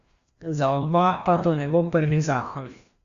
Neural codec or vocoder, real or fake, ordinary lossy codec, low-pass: codec, 16 kHz, 1 kbps, FreqCodec, larger model; fake; Opus, 64 kbps; 7.2 kHz